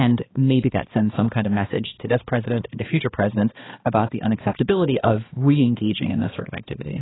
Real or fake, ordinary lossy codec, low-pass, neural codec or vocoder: fake; AAC, 16 kbps; 7.2 kHz; codec, 16 kHz, 2 kbps, X-Codec, HuBERT features, trained on balanced general audio